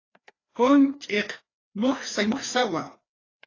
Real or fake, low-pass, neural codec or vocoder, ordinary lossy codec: fake; 7.2 kHz; codec, 16 kHz, 2 kbps, FreqCodec, larger model; AAC, 32 kbps